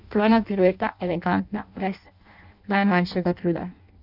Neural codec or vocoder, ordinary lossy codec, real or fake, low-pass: codec, 16 kHz in and 24 kHz out, 0.6 kbps, FireRedTTS-2 codec; none; fake; 5.4 kHz